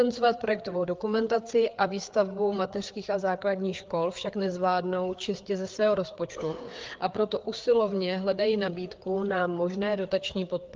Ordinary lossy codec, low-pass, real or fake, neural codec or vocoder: Opus, 32 kbps; 7.2 kHz; fake; codec, 16 kHz, 4 kbps, FreqCodec, larger model